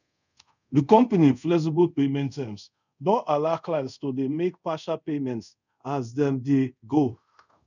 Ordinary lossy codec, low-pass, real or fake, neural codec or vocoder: none; 7.2 kHz; fake; codec, 24 kHz, 0.5 kbps, DualCodec